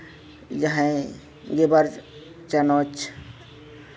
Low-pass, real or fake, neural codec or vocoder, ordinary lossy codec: none; real; none; none